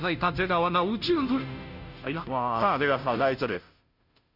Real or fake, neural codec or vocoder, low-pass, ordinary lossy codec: fake; codec, 16 kHz, 0.5 kbps, FunCodec, trained on Chinese and English, 25 frames a second; 5.4 kHz; none